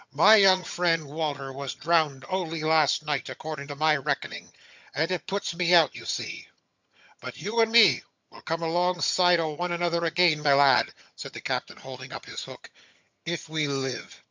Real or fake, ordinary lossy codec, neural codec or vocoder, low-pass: fake; MP3, 64 kbps; vocoder, 22.05 kHz, 80 mel bands, HiFi-GAN; 7.2 kHz